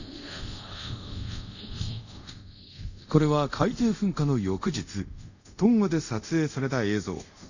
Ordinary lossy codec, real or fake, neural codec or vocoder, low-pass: none; fake; codec, 24 kHz, 0.5 kbps, DualCodec; 7.2 kHz